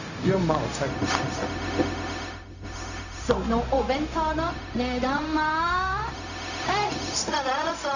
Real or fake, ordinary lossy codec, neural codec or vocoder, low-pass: fake; none; codec, 16 kHz, 0.4 kbps, LongCat-Audio-Codec; 7.2 kHz